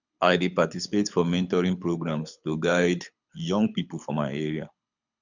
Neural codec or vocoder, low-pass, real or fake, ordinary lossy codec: codec, 24 kHz, 6 kbps, HILCodec; 7.2 kHz; fake; none